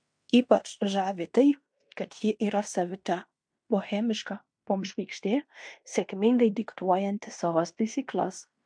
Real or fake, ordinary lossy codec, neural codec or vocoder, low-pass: fake; MP3, 64 kbps; codec, 16 kHz in and 24 kHz out, 0.9 kbps, LongCat-Audio-Codec, fine tuned four codebook decoder; 9.9 kHz